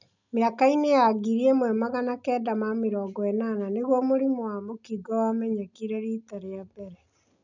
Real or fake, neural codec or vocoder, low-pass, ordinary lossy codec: real; none; 7.2 kHz; none